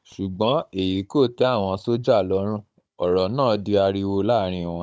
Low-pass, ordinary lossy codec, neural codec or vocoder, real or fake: none; none; codec, 16 kHz, 16 kbps, FunCodec, trained on Chinese and English, 50 frames a second; fake